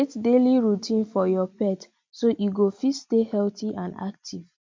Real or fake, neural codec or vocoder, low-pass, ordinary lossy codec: real; none; 7.2 kHz; MP3, 48 kbps